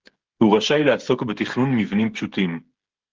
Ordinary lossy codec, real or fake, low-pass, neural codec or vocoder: Opus, 16 kbps; fake; 7.2 kHz; codec, 16 kHz, 16 kbps, FreqCodec, smaller model